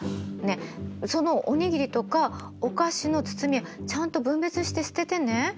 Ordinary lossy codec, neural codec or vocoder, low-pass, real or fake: none; none; none; real